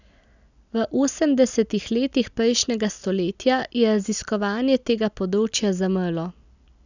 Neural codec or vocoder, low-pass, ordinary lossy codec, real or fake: none; 7.2 kHz; none; real